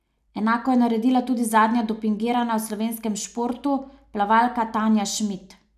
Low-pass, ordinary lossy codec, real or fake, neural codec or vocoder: 14.4 kHz; none; real; none